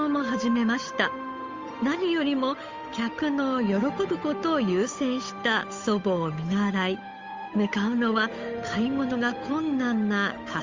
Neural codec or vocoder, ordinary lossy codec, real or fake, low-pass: codec, 16 kHz, 8 kbps, FunCodec, trained on Chinese and English, 25 frames a second; Opus, 32 kbps; fake; 7.2 kHz